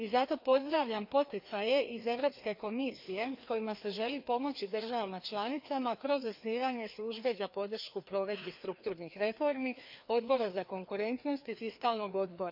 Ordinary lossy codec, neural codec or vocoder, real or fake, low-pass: MP3, 48 kbps; codec, 16 kHz, 2 kbps, FreqCodec, larger model; fake; 5.4 kHz